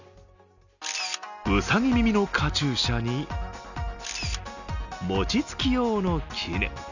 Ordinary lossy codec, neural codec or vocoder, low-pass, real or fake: none; none; 7.2 kHz; real